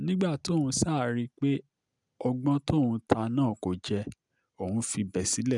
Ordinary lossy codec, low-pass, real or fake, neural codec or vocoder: none; 10.8 kHz; real; none